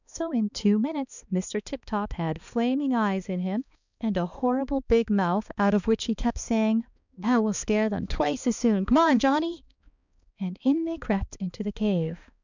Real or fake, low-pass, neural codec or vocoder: fake; 7.2 kHz; codec, 16 kHz, 2 kbps, X-Codec, HuBERT features, trained on balanced general audio